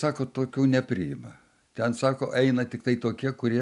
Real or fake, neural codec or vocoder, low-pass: real; none; 10.8 kHz